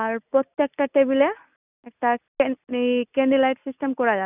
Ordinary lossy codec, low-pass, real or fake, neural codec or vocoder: none; 3.6 kHz; real; none